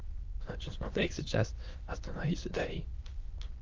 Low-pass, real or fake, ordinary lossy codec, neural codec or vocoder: 7.2 kHz; fake; Opus, 24 kbps; autoencoder, 22.05 kHz, a latent of 192 numbers a frame, VITS, trained on many speakers